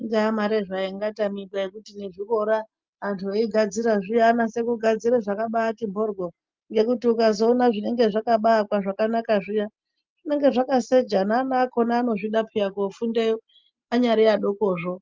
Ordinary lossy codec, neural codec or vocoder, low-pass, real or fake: Opus, 24 kbps; none; 7.2 kHz; real